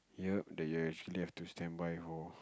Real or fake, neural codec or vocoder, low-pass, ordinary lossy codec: real; none; none; none